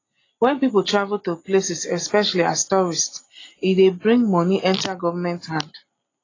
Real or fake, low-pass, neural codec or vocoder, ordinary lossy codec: real; 7.2 kHz; none; AAC, 32 kbps